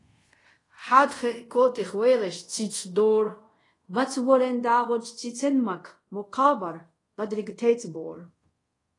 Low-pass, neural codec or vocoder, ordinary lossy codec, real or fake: 10.8 kHz; codec, 24 kHz, 0.5 kbps, DualCodec; AAC, 32 kbps; fake